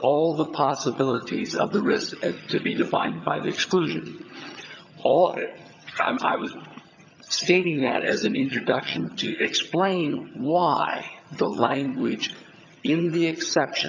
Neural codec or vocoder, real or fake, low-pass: vocoder, 22.05 kHz, 80 mel bands, HiFi-GAN; fake; 7.2 kHz